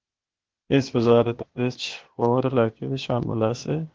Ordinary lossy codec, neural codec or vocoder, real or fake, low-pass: Opus, 16 kbps; codec, 16 kHz, 0.8 kbps, ZipCodec; fake; 7.2 kHz